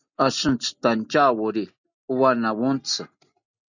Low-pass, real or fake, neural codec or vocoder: 7.2 kHz; real; none